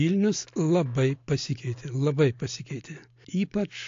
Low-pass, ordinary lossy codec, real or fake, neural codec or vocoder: 7.2 kHz; AAC, 48 kbps; real; none